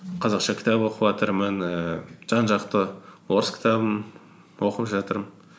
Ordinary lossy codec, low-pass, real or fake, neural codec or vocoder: none; none; real; none